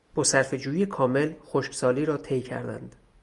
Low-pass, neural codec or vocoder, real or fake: 10.8 kHz; none; real